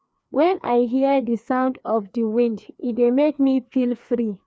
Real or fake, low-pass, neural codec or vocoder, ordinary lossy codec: fake; none; codec, 16 kHz, 2 kbps, FreqCodec, larger model; none